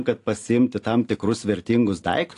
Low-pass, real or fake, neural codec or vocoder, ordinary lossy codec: 10.8 kHz; real; none; AAC, 48 kbps